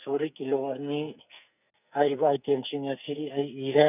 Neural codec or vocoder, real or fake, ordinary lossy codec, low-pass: codec, 44.1 kHz, 2.6 kbps, SNAC; fake; none; 3.6 kHz